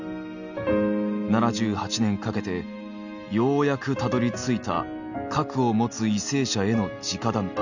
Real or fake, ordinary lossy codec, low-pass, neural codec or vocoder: real; none; 7.2 kHz; none